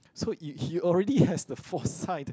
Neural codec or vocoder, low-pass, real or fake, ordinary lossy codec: none; none; real; none